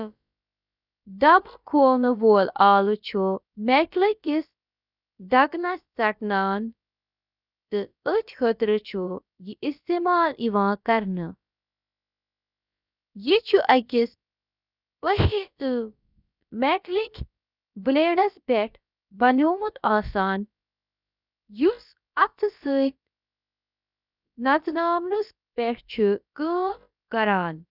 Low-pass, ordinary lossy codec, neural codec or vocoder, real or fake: 5.4 kHz; none; codec, 16 kHz, about 1 kbps, DyCAST, with the encoder's durations; fake